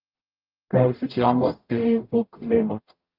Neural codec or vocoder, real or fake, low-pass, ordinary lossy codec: codec, 44.1 kHz, 0.9 kbps, DAC; fake; 5.4 kHz; Opus, 24 kbps